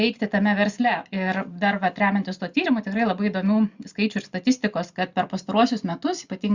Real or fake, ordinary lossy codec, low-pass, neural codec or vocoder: real; Opus, 64 kbps; 7.2 kHz; none